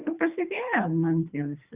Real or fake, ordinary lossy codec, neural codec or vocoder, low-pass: fake; Opus, 64 kbps; codec, 24 kHz, 3 kbps, HILCodec; 3.6 kHz